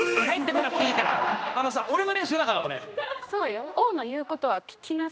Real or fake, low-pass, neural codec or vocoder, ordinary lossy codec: fake; none; codec, 16 kHz, 1 kbps, X-Codec, HuBERT features, trained on general audio; none